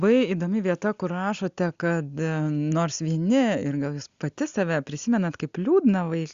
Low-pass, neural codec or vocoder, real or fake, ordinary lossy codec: 7.2 kHz; none; real; Opus, 64 kbps